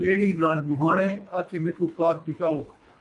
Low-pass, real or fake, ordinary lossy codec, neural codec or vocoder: 10.8 kHz; fake; MP3, 96 kbps; codec, 24 kHz, 1.5 kbps, HILCodec